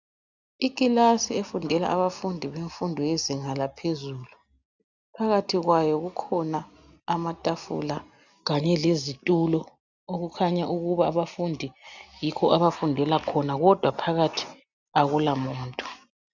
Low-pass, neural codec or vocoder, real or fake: 7.2 kHz; none; real